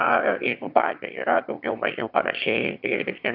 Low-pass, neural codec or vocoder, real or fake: 5.4 kHz; autoencoder, 22.05 kHz, a latent of 192 numbers a frame, VITS, trained on one speaker; fake